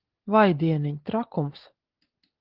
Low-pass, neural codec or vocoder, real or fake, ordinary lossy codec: 5.4 kHz; none; real; Opus, 16 kbps